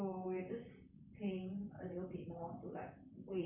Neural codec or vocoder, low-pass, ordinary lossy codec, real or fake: vocoder, 44.1 kHz, 128 mel bands, Pupu-Vocoder; 3.6 kHz; none; fake